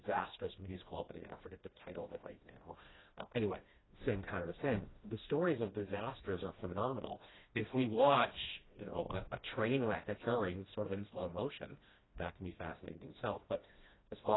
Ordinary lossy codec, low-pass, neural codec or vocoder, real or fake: AAC, 16 kbps; 7.2 kHz; codec, 16 kHz, 1 kbps, FreqCodec, smaller model; fake